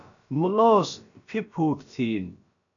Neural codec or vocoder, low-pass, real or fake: codec, 16 kHz, about 1 kbps, DyCAST, with the encoder's durations; 7.2 kHz; fake